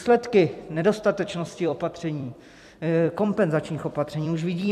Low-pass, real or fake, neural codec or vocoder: 14.4 kHz; fake; vocoder, 44.1 kHz, 128 mel bands every 256 samples, BigVGAN v2